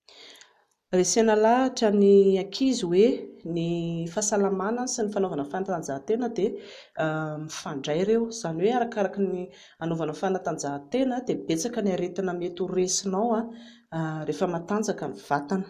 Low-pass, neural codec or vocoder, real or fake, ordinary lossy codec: 14.4 kHz; none; real; none